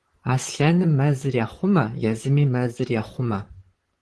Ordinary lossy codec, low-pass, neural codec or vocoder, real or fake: Opus, 16 kbps; 10.8 kHz; vocoder, 44.1 kHz, 128 mel bands, Pupu-Vocoder; fake